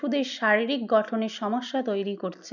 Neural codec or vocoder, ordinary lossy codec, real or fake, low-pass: none; none; real; 7.2 kHz